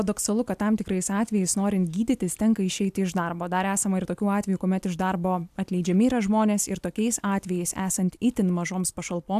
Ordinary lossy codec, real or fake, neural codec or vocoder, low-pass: AAC, 96 kbps; real; none; 14.4 kHz